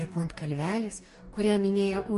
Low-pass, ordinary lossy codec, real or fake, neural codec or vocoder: 14.4 kHz; MP3, 48 kbps; fake; codec, 44.1 kHz, 2.6 kbps, DAC